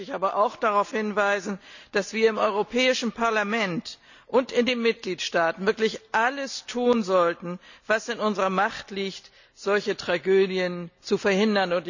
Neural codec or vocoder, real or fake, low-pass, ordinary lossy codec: none; real; 7.2 kHz; none